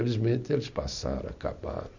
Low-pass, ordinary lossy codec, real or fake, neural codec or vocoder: 7.2 kHz; none; real; none